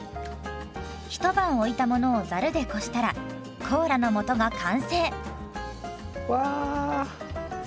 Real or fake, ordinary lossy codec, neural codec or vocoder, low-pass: real; none; none; none